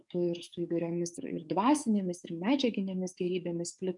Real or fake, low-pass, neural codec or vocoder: fake; 10.8 kHz; codec, 44.1 kHz, 7.8 kbps, DAC